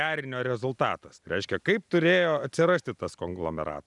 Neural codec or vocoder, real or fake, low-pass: none; real; 10.8 kHz